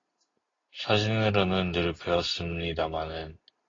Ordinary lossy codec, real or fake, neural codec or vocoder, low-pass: AAC, 32 kbps; real; none; 7.2 kHz